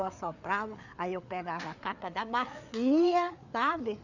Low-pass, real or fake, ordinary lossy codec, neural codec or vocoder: 7.2 kHz; fake; none; codec, 16 kHz, 4 kbps, FreqCodec, larger model